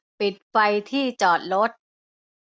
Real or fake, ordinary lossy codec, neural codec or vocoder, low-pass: real; none; none; none